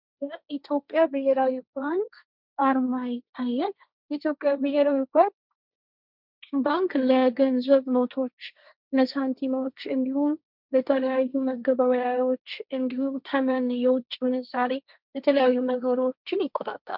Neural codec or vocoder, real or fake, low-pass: codec, 16 kHz, 1.1 kbps, Voila-Tokenizer; fake; 5.4 kHz